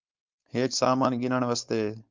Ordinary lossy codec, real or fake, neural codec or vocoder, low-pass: Opus, 24 kbps; fake; codec, 16 kHz, 4.8 kbps, FACodec; 7.2 kHz